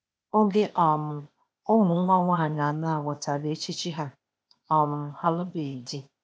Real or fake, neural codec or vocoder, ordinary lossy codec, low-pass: fake; codec, 16 kHz, 0.8 kbps, ZipCodec; none; none